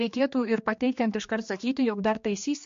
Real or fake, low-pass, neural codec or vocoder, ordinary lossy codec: fake; 7.2 kHz; codec, 16 kHz, 2 kbps, X-Codec, HuBERT features, trained on general audio; MP3, 48 kbps